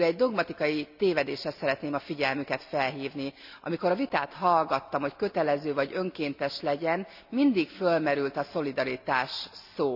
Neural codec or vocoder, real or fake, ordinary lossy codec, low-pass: none; real; none; 5.4 kHz